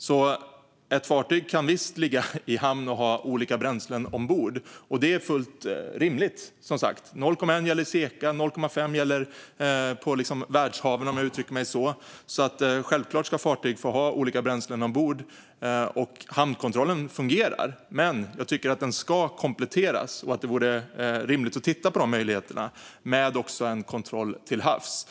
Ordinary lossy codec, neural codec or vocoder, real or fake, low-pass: none; none; real; none